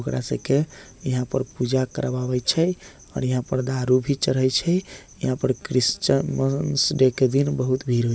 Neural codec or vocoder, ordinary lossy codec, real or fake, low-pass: none; none; real; none